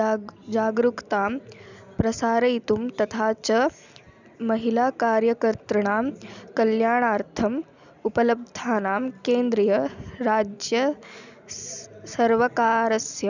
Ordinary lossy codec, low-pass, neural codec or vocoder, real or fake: none; 7.2 kHz; none; real